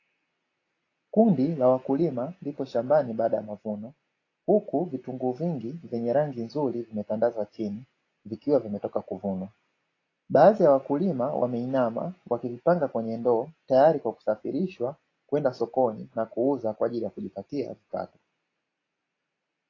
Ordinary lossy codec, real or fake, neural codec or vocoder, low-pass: AAC, 32 kbps; real; none; 7.2 kHz